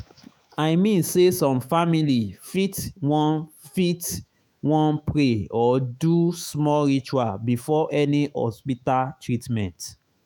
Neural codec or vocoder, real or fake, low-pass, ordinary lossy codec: autoencoder, 48 kHz, 128 numbers a frame, DAC-VAE, trained on Japanese speech; fake; none; none